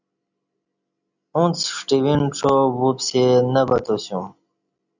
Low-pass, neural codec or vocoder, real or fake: 7.2 kHz; none; real